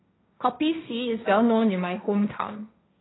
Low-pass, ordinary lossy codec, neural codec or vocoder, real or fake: 7.2 kHz; AAC, 16 kbps; codec, 16 kHz, 1.1 kbps, Voila-Tokenizer; fake